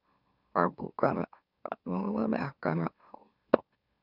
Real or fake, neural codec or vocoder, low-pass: fake; autoencoder, 44.1 kHz, a latent of 192 numbers a frame, MeloTTS; 5.4 kHz